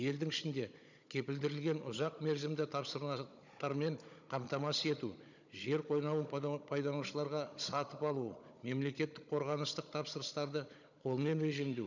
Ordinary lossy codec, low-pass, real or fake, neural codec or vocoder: none; 7.2 kHz; fake; codec, 16 kHz, 16 kbps, FunCodec, trained on Chinese and English, 50 frames a second